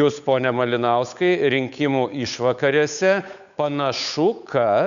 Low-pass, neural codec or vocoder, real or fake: 7.2 kHz; codec, 16 kHz, 8 kbps, FunCodec, trained on Chinese and English, 25 frames a second; fake